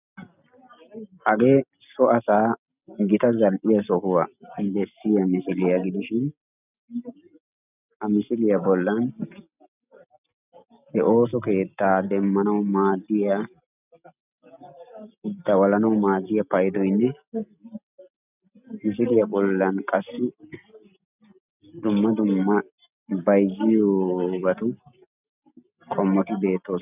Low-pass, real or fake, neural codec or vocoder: 3.6 kHz; real; none